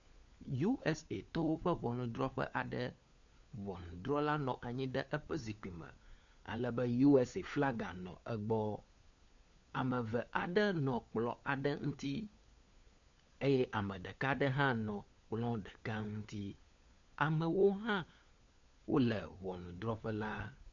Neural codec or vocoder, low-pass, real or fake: codec, 16 kHz, 4 kbps, FunCodec, trained on LibriTTS, 50 frames a second; 7.2 kHz; fake